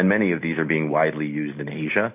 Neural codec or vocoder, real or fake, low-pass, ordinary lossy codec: none; real; 3.6 kHz; AAC, 32 kbps